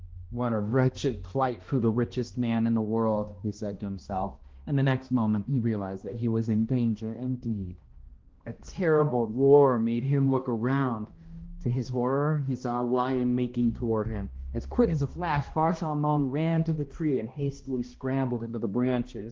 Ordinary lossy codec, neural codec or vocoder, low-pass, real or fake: Opus, 16 kbps; codec, 16 kHz, 1 kbps, X-Codec, HuBERT features, trained on balanced general audio; 7.2 kHz; fake